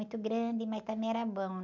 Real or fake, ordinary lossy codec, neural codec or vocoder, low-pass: real; none; none; 7.2 kHz